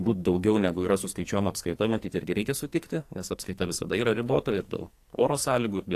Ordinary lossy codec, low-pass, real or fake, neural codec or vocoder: AAC, 64 kbps; 14.4 kHz; fake; codec, 44.1 kHz, 2.6 kbps, SNAC